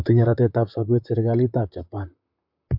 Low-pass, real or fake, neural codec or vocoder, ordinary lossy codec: 5.4 kHz; fake; vocoder, 44.1 kHz, 128 mel bands, Pupu-Vocoder; MP3, 48 kbps